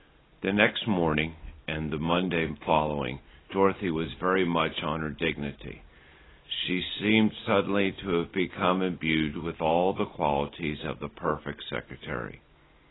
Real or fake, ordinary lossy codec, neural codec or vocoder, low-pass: real; AAC, 16 kbps; none; 7.2 kHz